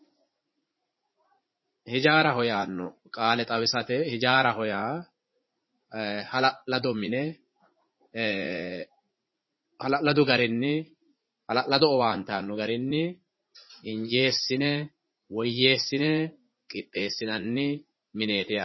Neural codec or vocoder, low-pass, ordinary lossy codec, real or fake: vocoder, 44.1 kHz, 80 mel bands, Vocos; 7.2 kHz; MP3, 24 kbps; fake